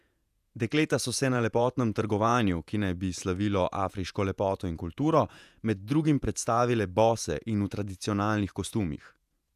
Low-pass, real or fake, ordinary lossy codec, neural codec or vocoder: 14.4 kHz; fake; none; vocoder, 48 kHz, 128 mel bands, Vocos